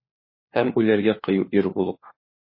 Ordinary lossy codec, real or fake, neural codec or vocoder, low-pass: MP3, 24 kbps; fake; codec, 16 kHz, 4 kbps, FunCodec, trained on LibriTTS, 50 frames a second; 5.4 kHz